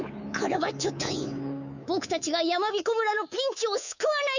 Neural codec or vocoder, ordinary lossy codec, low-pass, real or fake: codec, 24 kHz, 3.1 kbps, DualCodec; none; 7.2 kHz; fake